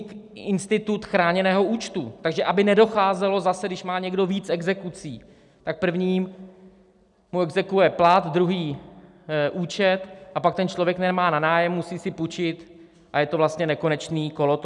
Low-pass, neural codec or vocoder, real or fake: 10.8 kHz; none; real